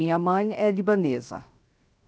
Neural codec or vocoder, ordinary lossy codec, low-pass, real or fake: codec, 16 kHz, 0.7 kbps, FocalCodec; none; none; fake